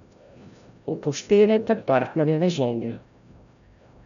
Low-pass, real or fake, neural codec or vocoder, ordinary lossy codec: 7.2 kHz; fake; codec, 16 kHz, 0.5 kbps, FreqCodec, larger model; none